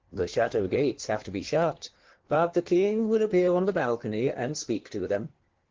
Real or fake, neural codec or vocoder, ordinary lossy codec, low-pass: fake; codec, 16 kHz in and 24 kHz out, 1.1 kbps, FireRedTTS-2 codec; Opus, 16 kbps; 7.2 kHz